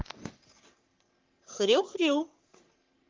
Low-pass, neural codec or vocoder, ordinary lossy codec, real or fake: 7.2 kHz; codec, 44.1 kHz, 3.4 kbps, Pupu-Codec; Opus, 24 kbps; fake